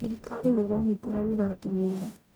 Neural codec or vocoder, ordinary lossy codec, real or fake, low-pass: codec, 44.1 kHz, 0.9 kbps, DAC; none; fake; none